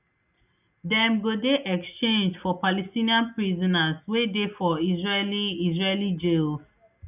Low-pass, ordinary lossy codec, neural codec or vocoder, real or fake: 3.6 kHz; none; none; real